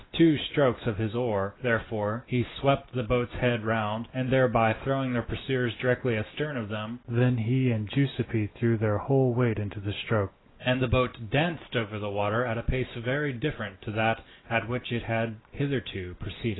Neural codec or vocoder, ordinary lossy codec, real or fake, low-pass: none; AAC, 16 kbps; real; 7.2 kHz